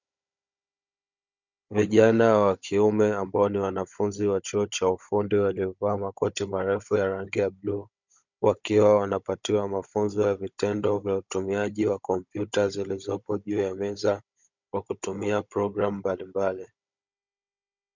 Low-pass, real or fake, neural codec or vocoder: 7.2 kHz; fake; codec, 16 kHz, 16 kbps, FunCodec, trained on Chinese and English, 50 frames a second